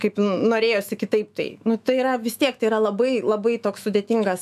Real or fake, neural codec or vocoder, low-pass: fake; autoencoder, 48 kHz, 128 numbers a frame, DAC-VAE, trained on Japanese speech; 14.4 kHz